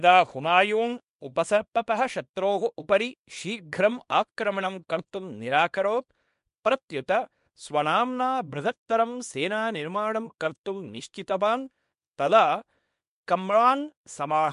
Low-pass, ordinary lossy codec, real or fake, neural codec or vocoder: 10.8 kHz; MP3, 64 kbps; fake; codec, 24 kHz, 0.9 kbps, WavTokenizer, small release